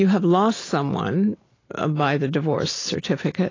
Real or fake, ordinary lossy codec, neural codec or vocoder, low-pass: real; AAC, 32 kbps; none; 7.2 kHz